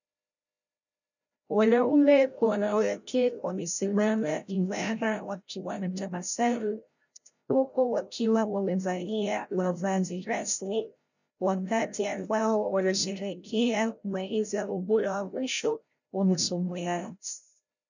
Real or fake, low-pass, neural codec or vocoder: fake; 7.2 kHz; codec, 16 kHz, 0.5 kbps, FreqCodec, larger model